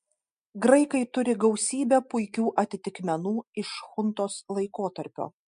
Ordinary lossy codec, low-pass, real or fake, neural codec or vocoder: MP3, 64 kbps; 9.9 kHz; real; none